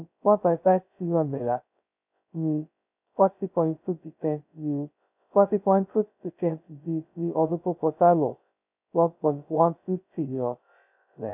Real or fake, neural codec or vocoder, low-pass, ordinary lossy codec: fake; codec, 16 kHz, 0.2 kbps, FocalCodec; 3.6 kHz; none